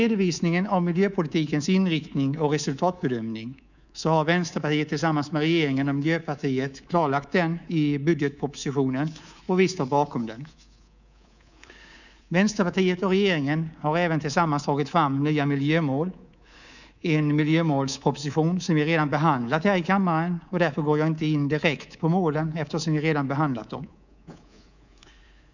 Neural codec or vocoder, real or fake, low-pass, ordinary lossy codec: codec, 24 kHz, 3.1 kbps, DualCodec; fake; 7.2 kHz; none